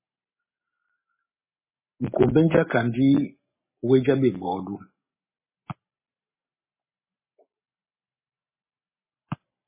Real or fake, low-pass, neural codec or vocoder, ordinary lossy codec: real; 3.6 kHz; none; MP3, 16 kbps